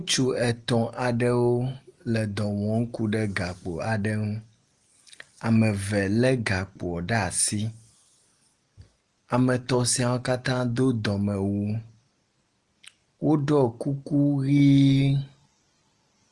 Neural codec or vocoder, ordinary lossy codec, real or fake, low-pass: none; Opus, 24 kbps; real; 10.8 kHz